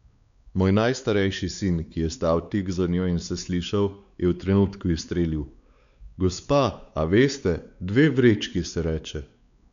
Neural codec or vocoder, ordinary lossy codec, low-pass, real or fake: codec, 16 kHz, 4 kbps, X-Codec, WavLM features, trained on Multilingual LibriSpeech; none; 7.2 kHz; fake